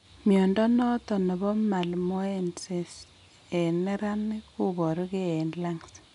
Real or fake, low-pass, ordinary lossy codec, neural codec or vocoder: real; 10.8 kHz; none; none